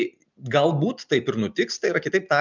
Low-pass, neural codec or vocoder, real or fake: 7.2 kHz; none; real